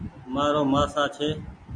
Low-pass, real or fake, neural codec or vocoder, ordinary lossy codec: 9.9 kHz; real; none; MP3, 48 kbps